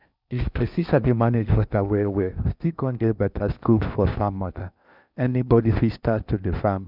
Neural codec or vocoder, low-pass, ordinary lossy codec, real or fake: codec, 16 kHz in and 24 kHz out, 0.6 kbps, FocalCodec, streaming, 4096 codes; 5.4 kHz; none; fake